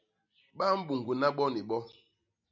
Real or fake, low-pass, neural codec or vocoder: real; 7.2 kHz; none